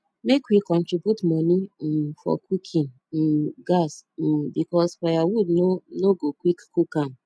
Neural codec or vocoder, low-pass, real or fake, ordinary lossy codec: none; none; real; none